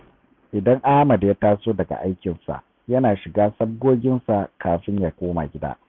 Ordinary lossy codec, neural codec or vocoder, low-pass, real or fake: none; none; none; real